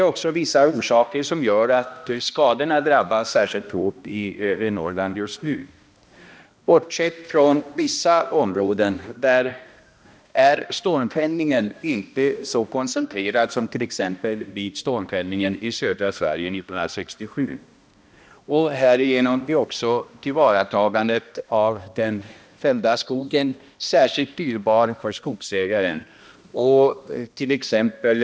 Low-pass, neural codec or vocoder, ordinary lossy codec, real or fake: none; codec, 16 kHz, 1 kbps, X-Codec, HuBERT features, trained on balanced general audio; none; fake